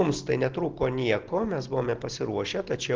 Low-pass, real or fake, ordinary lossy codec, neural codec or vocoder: 7.2 kHz; real; Opus, 16 kbps; none